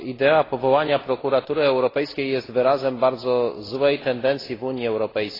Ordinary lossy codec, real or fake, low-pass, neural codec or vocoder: AAC, 24 kbps; real; 5.4 kHz; none